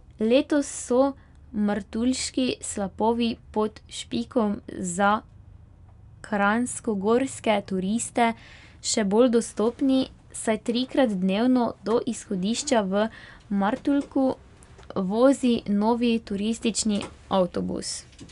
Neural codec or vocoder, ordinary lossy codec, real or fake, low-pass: none; none; real; 10.8 kHz